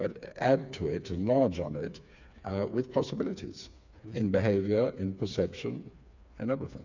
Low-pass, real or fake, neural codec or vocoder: 7.2 kHz; fake; codec, 16 kHz, 4 kbps, FreqCodec, smaller model